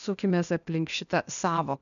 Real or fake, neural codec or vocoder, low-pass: fake; codec, 16 kHz, 0.8 kbps, ZipCodec; 7.2 kHz